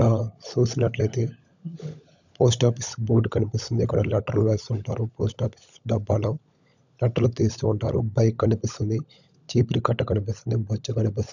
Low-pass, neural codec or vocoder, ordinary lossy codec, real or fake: 7.2 kHz; codec, 16 kHz, 16 kbps, FunCodec, trained on LibriTTS, 50 frames a second; none; fake